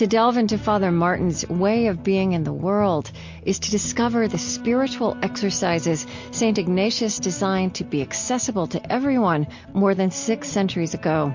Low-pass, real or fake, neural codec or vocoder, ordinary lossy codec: 7.2 kHz; real; none; MP3, 48 kbps